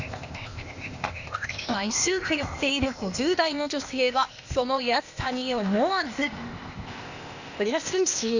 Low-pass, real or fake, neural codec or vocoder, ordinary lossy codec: 7.2 kHz; fake; codec, 16 kHz, 0.8 kbps, ZipCodec; none